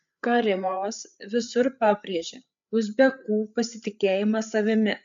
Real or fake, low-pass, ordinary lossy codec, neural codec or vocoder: fake; 7.2 kHz; MP3, 64 kbps; codec, 16 kHz, 8 kbps, FreqCodec, larger model